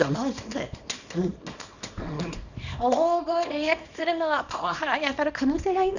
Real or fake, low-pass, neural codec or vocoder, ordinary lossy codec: fake; 7.2 kHz; codec, 24 kHz, 0.9 kbps, WavTokenizer, small release; none